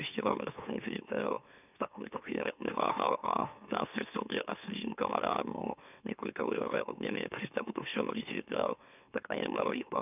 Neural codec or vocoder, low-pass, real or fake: autoencoder, 44.1 kHz, a latent of 192 numbers a frame, MeloTTS; 3.6 kHz; fake